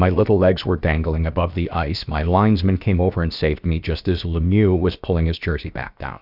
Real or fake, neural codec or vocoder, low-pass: fake; codec, 16 kHz, about 1 kbps, DyCAST, with the encoder's durations; 5.4 kHz